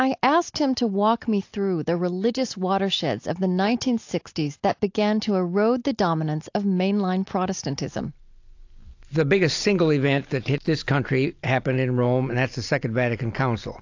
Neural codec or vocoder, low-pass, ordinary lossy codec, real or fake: none; 7.2 kHz; AAC, 48 kbps; real